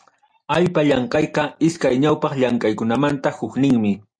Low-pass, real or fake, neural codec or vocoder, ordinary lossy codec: 9.9 kHz; real; none; MP3, 48 kbps